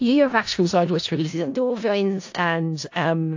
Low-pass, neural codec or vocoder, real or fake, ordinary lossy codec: 7.2 kHz; codec, 16 kHz in and 24 kHz out, 0.4 kbps, LongCat-Audio-Codec, four codebook decoder; fake; AAC, 48 kbps